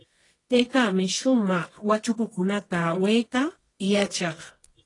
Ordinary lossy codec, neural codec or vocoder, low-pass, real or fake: AAC, 32 kbps; codec, 24 kHz, 0.9 kbps, WavTokenizer, medium music audio release; 10.8 kHz; fake